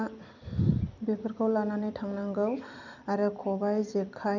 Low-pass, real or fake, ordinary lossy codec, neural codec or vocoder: 7.2 kHz; fake; none; vocoder, 44.1 kHz, 128 mel bands every 256 samples, BigVGAN v2